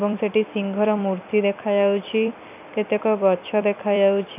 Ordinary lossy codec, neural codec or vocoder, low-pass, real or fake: none; vocoder, 44.1 kHz, 128 mel bands every 256 samples, BigVGAN v2; 3.6 kHz; fake